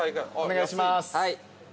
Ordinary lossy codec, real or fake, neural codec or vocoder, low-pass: none; real; none; none